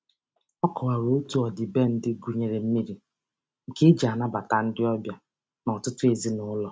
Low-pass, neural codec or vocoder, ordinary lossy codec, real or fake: none; none; none; real